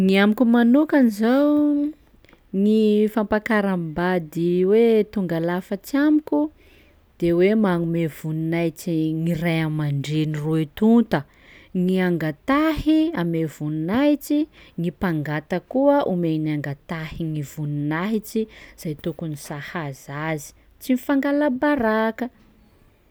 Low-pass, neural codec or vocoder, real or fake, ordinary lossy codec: none; none; real; none